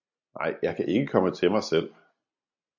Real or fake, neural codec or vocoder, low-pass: real; none; 7.2 kHz